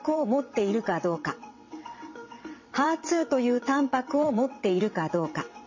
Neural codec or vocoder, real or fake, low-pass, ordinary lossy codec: vocoder, 22.05 kHz, 80 mel bands, WaveNeXt; fake; 7.2 kHz; MP3, 32 kbps